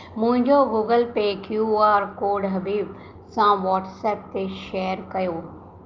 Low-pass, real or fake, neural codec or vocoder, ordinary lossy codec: 7.2 kHz; real; none; Opus, 24 kbps